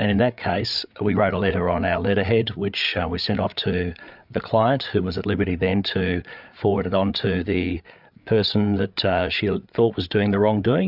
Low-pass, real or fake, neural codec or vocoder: 5.4 kHz; fake; codec, 16 kHz, 8 kbps, FreqCodec, larger model